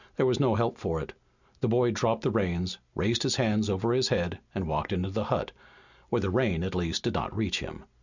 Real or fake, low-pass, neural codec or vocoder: real; 7.2 kHz; none